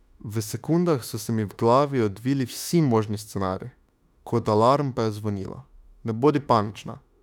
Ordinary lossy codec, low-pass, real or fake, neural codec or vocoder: none; 19.8 kHz; fake; autoencoder, 48 kHz, 32 numbers a frame, DAC-VAE, trained on Japanese speech